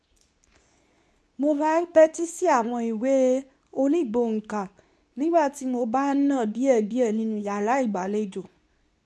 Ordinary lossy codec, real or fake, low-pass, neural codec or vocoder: none; fake; none; codec, 24 kHz, 0.9 kbps, WavTokenizer, medium speech release version 2